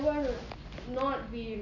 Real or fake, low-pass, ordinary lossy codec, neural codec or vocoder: real; 7.2 kHz; none; none